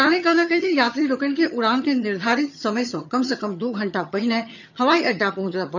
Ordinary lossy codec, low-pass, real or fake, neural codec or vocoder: none; 7.2 kHz; fake; vocoder, 22.05 kHz, 80 mel bands, HiFi-GAN